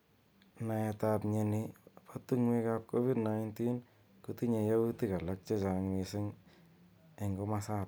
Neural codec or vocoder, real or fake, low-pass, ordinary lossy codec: none; real; none; none